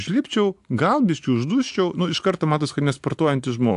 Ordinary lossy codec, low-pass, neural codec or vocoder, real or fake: AAC, 64 kbps; 10.8 kHz; none; real